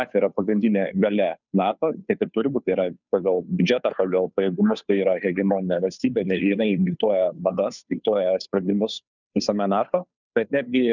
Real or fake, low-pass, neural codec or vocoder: fake; 7.2 kHz; codec, 16 kHz, 2 kbps, FunCodec, trained on Chinese and English, 25 frames a second